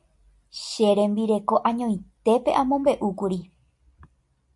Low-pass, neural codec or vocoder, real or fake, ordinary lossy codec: 10.8 kHz; none; real; MP3, 64 kbps